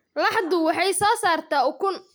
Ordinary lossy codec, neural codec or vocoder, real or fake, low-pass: none; none; real; none